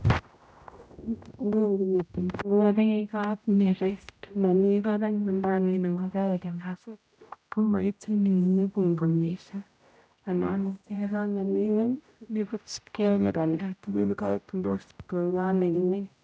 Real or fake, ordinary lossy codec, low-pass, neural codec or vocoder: fake; none; none; codec, 16 kHz, 0.5 kbps, X-Codec, HuBERT features, trained on general audio